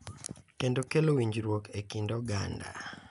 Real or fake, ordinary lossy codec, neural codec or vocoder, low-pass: real; none; none; 10.8 kHz